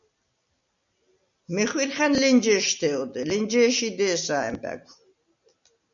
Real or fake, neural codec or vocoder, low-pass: real; none; 7.2 kHz